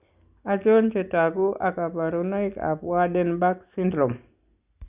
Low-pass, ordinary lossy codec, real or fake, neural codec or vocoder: 3.6 kHz; Opus, 64 kbps; real; none